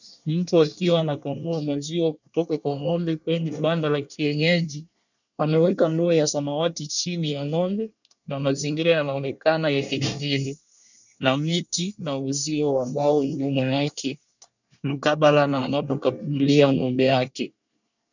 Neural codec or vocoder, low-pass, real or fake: codec, 24 kHz, 1 kbps, SNAC; 7.2 kHz; fake